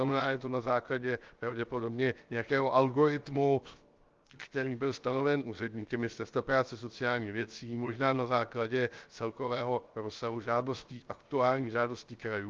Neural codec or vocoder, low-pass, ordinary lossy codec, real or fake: codec, 16 kHz, 0.7 kbps, FocalCodec; 7.2 kHz; Opus, 32 kbps; fake